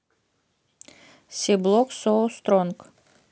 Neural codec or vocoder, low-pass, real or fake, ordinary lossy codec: none; none; real; none